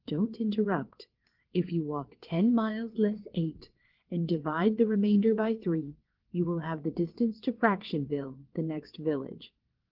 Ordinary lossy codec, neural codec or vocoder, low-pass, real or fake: Opus, 16 kbps; vocoder, 22.05 kHz, 80 mel bands, WaveNeXt; 5.4 kHz; fake